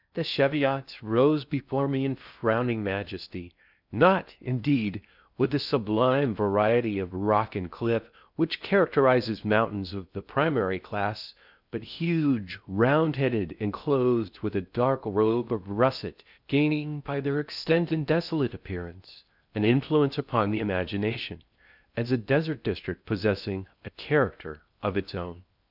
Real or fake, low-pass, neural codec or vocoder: fake; 5.4 kHz; codec, 16 kHz in and 24 kHz out, 0.6 kbps, FocalCodec, streaming, 2048 codes